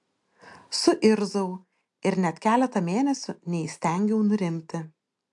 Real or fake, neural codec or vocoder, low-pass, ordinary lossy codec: real; none; 10.8 kHz; AAC, 64 kbps